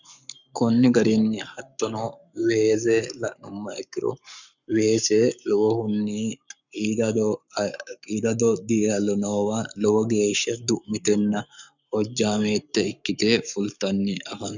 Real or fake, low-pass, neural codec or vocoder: fake; 7.2 kHz; codec, 44.1 kHz, 7.8 kbps, Pupu-Codec